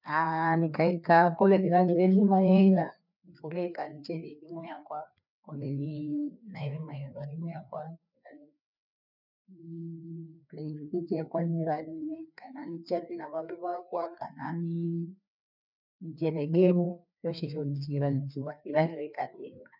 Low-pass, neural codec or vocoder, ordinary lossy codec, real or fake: 5.4 kHz; codec, 16 kHz, 2 kbps, FreqCodec, larger model; none; fake